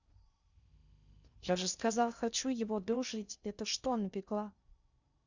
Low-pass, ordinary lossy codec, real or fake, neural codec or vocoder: 7.2 kHz; Opus, 64 kbps; fake; codec, 16 kHz in and 24 kHz out, 0.6 kbps, FocalCodec, streaming, 4096 codes